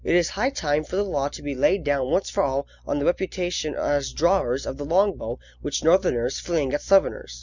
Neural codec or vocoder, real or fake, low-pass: none; real; 7.2 kHz